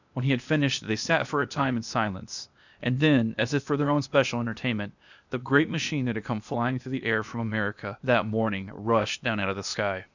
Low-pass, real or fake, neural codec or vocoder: 7.2 kHz; fake; codec, 16 kHz, 0.8 kbps, ZipCodec